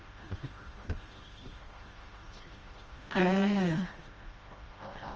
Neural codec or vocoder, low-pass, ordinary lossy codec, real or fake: codec, 16 kHz, 1 kbps, FreqCodec, smaller model; 7.2 kHz; Opus, 24 kbps; fake